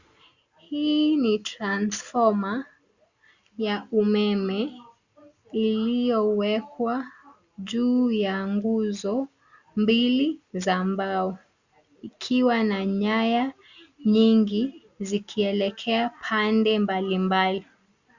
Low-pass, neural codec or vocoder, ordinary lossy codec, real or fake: 7.2 kHz; none; Opus, 64 kbps; real